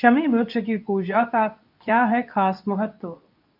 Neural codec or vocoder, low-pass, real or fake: codec, 24 kHz, 0.9 kbps, WavTokenizer, medium speech release version 2; 5.4 kHz; fake